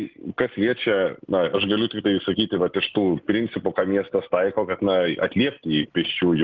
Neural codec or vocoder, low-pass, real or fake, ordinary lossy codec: none; 7.2 kHz; real; Opus, 32 kbps